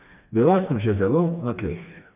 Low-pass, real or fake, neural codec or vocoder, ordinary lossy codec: 3.6 kHz; fake; codec, 16 kHz, 2 kbps, FreqCodec, smaller model; none